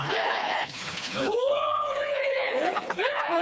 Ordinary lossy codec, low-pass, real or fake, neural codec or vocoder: none; none; fake; codec, 16 kHz, 2 kbps, FreqCodec, smaller model